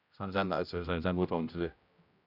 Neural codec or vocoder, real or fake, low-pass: codec, 16 kHz, 0.5 kbps, X-Codec, HuBERT features, trained on general audio; fake; 5.4 kHz